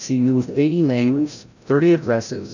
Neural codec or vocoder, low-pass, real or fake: codec, 16 kHz, 0.5 kbps, FreqCodec, larger model; 7.2 kHz; fake